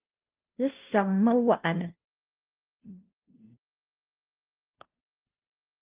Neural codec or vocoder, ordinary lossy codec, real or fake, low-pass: codec, 16 kHz, 0.5 kbps, FunCodec, trained on Chinese and English, 25 frames a second; Opus, 24 kbps; fake; 3.6 kHz